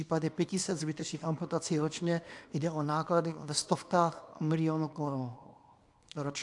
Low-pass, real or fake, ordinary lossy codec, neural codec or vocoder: 10.8 kHz; fake; AAC, 64 kbps; codec, 24 kHz, 0.9 kbps, WavTokenizer, small release